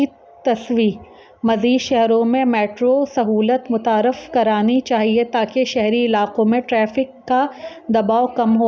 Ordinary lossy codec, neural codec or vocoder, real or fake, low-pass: none; none; real; none